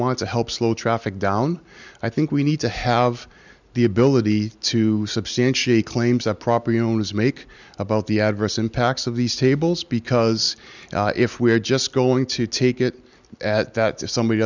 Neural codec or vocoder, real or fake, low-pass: none; real; 7.2 kHz